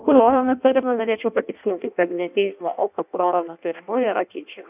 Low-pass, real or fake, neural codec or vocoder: 3.6 kHz; fake; codec, 16 kHz in and 24 kHz out, 0.6 kbps, FireRedTTS-2 codec